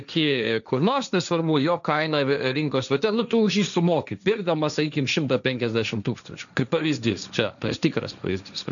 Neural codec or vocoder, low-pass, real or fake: codec, 16 kHz, 1.1 kbps, Voila-Tokenizer; 7.2 kHz; fake